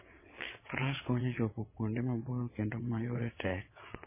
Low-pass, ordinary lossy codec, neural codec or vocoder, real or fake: 3.6 kHz; MP3, 16 kbps; vocoder, 22.05 kHz, 80 mel bands, WaveNeXt; fake